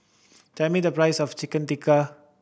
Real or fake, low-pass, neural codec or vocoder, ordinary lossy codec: real; none; none; none